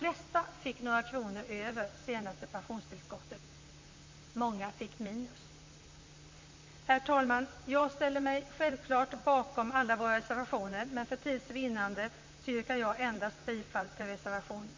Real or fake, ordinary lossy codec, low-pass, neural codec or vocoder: fake; MP3, 48 kbps; 7.2 kHz; vocoder, 44.1 kHz, 128 mel bands, Pupu-Vocoder